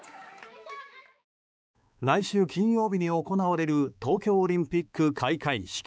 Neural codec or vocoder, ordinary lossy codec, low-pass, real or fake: codec, 16 kHz, 4 kbps, X-Codec, HuBERT features, trained on balanced general audio; none; none; fake